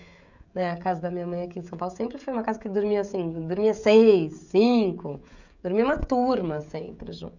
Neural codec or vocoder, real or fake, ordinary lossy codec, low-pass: codec, 16 kHz, 16 kbps, FreqCodec, smaller model; fake; none; 7.2 kHz